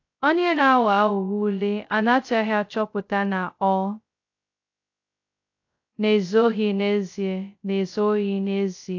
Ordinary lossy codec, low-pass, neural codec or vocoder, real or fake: AAC, 48 kbps; 7.2 kHz; codec, 16 kHz, 0.2 kbps, FocalCodec; fake